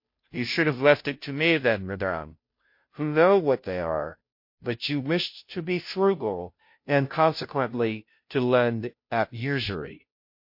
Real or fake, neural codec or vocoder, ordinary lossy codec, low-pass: fake; codec, 16 kHz, 0.5 kbps, FunCodec, trained on Chinese and English, 25 frames a second; MP3, 32 kbps; 5.4 kHz